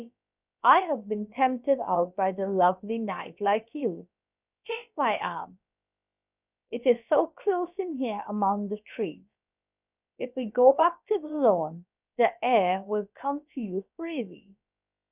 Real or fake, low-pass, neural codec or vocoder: fake; 3.6 kHz; codec, 16 kHz, about 1 kbps, DyCAST, with the encoder's durations